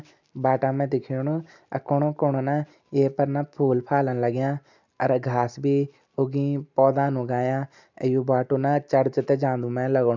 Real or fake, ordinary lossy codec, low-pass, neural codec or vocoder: real; MP3, 64 kbps; 7.2 kHz; none